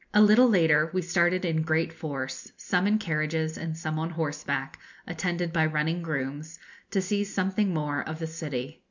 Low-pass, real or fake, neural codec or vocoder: 7.2 kHz; real; none